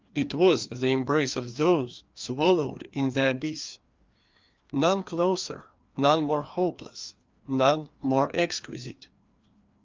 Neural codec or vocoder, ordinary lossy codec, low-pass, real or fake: codec, 16 kHz, 2 kbps, FreqCodec, larger model; Opus, 32 kbps; 7.2 kHz; fake